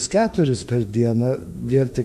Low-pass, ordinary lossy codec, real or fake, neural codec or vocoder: 14.4 kHz; MP3, 96 kbps; fake; autoencoder, 48 kHz, 32 numbers a frame, DAC-VAE, trained on Japanese speech